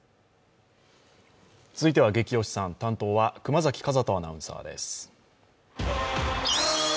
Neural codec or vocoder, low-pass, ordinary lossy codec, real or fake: none; none; none; real